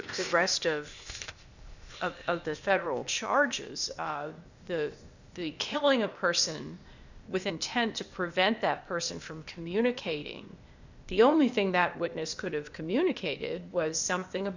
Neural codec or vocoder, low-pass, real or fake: codec, 16 kHz, 0.8 kbps, ZipCodec; 7.2 kHz; fake